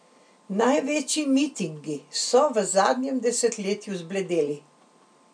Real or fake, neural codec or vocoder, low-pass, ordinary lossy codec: real; none; 9.9 kHz; none